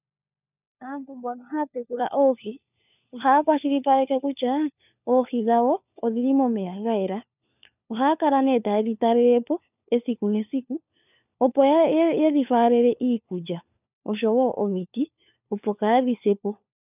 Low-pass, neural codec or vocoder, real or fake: 3.6 kHz; codec, 16 kHz, 4 kbps, FunCodec, trained on LibriTTS, 50 frames a second; fake